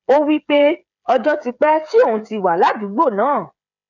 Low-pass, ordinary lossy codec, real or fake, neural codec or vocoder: 7.2 kHz; none; fake; codec, 16 kHz, 8 kbps, FreqCodec, smaller model